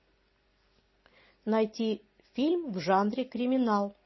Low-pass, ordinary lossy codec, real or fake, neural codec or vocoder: 7.2 kHz; MP3, 24 kbps; real; none